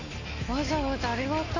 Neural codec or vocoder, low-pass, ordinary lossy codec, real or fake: none; 7.2 kHz; AAC, 32 kbps; real